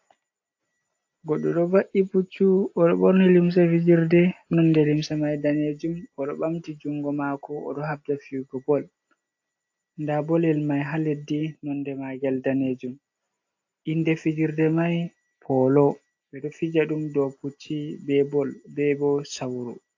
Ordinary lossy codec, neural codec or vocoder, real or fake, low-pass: AAC, 48 kbps; none; real; 7.2 kHz